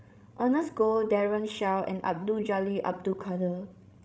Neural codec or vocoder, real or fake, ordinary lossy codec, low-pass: codec, 16 kHz, 16 kbps, FreqCodec, larger model; fake; none; none